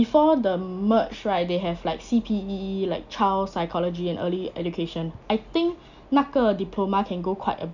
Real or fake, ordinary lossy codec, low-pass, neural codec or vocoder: real; none; 7.2 kHz; none